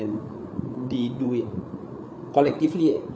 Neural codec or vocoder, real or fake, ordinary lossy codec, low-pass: codec, 16 kHz, 8 kbps, FreqCodec, larger model; fake; none; none